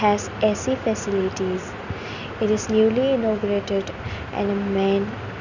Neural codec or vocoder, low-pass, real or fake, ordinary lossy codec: none; 7.2 kHz; real; none